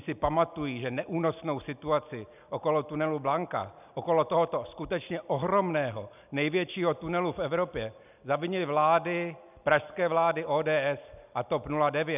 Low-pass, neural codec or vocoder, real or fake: 3.6 kHz; none; real